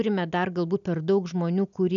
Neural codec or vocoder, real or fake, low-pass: none; real; 7.2 kHz